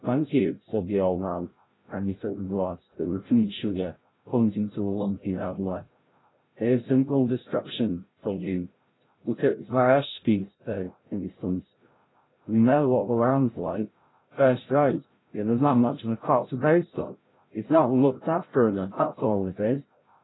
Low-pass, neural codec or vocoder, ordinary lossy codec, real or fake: 7.2 kHz; codec, 16 kHz, 0.5 kbps, FreqCodec, larger model; AAC, 16 kbps; fake